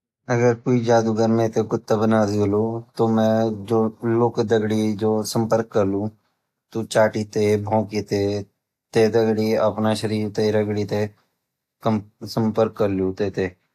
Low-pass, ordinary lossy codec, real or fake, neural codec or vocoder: 14.4 kHz; AAC, 64 kbps; real; none